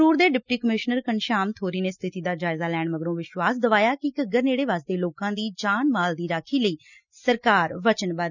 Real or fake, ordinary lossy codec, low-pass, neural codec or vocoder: real; none; 7.2 kHz; none